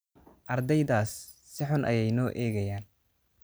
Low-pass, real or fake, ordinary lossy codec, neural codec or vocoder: none; real; none; none